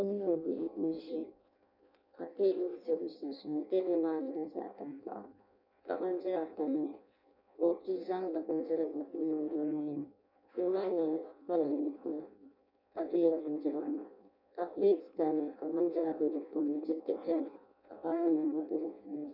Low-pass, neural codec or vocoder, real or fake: 5.4 kHz; codec, 16 kHz in and 24 kHz out, 0.6 kbps, FireRedTTS-2 codec; fake